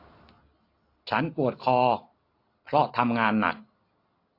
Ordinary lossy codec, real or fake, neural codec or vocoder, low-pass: AAC, 32 kbps; real; none; 5.4 kHz